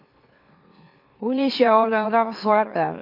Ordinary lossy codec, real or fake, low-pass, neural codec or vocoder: MP3, 32 kbps; fake; 5.4 kHz; autoencoder, 44.1 kHz, a latent of 192 numbers a frame, MeloTTS